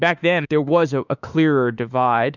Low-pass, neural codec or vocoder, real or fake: 7.2 kHz; autoencoder, 48 kHz, 32 numbers a frame, DAC-VAE, trained on Japanese speech; fake